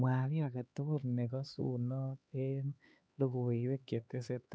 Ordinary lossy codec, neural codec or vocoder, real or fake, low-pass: none; codec, 16 kHz, 4 kbps, X-Codec, HuBERT features, trained on LibriSpeech; fake; none